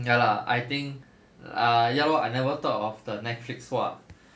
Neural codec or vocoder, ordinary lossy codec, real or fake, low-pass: none; none; real; none